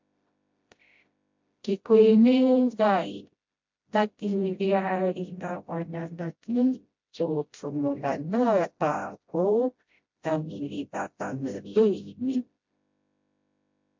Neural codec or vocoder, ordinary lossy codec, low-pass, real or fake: codec, 16 kHz, 0.5 kbps, FreqCodec, smaller model; MP3, 48 kbps; 7.2 kHz; fake